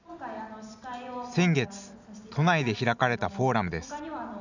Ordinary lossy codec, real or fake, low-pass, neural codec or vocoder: none; real; 7.2 kHz; none